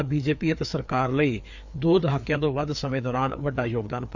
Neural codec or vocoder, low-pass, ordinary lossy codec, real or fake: codec, 16 kHz, 4 kbps, FreqCodec, larger model; 7.2 kHz; none; fake